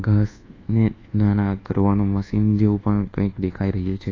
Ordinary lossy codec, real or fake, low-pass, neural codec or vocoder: AAC, 32 kbps; fake; 7.2 kHz; codec, 24 kHz, 1.2 kbps, DualCodec